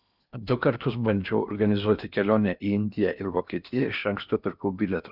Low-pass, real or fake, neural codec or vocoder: 5.4 kHz; fake; codec, 16 kHz in and 24 kHz out, 0.8 kbps, FocalCodec, streaming, 65536 codes